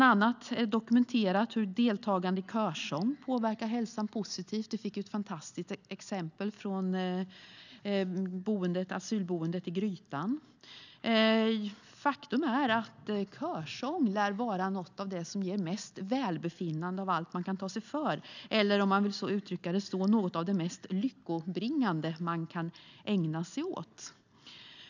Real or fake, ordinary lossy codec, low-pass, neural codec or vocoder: real; none; 7.2 kHz; none